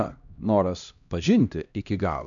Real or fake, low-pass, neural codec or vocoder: fake; 7.2 kHz; codec, 16 kHz, 1 kbps, X-Codec, HuBERT features, trained on LibriSpeech